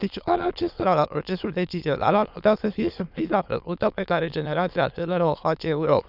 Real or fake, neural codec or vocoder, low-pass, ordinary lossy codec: fake; autoencoder, 22.05 kHz, a latent of 192 numbers a frame, VITS, trained on many speakers; 5.4 kHz; none